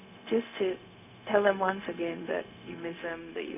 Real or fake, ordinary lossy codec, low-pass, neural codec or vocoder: fake; none; 3.6 kHz; codec, 16 kHz, 0.4 kbps, LongCat-Audio-Codec